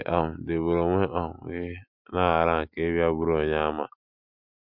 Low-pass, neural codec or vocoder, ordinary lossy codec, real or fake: 5.4 kHz; none; MP3, 48 kbps; real